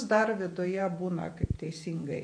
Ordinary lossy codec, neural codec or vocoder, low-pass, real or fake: MP3, 48 kbps; vocoder, 48 kHz, 128 mel bands, Vocos; 9.9 kHz; fake